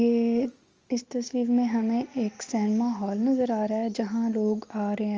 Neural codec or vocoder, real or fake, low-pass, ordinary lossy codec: none; real; 7.2 kHz; Opus, 24 kbps